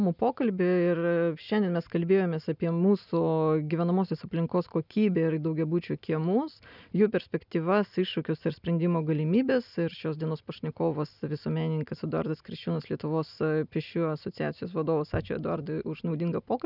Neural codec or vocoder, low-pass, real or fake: none; 5.4 kHz; real